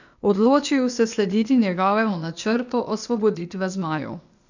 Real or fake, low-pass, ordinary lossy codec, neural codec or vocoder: fake; 7.2 kHz; none; codec, 16 kHz, 0.8 kbps, ZipCodec